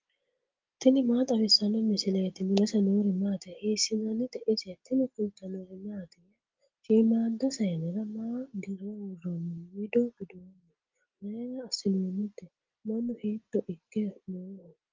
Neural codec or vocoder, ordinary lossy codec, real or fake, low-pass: none; Opus, 24 kbps; real; 7.2 kHz